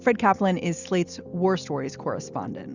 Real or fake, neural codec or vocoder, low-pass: real; none; 7.2 kHz